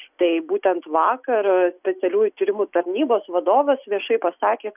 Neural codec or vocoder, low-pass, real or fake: none; 3.6 kHz; real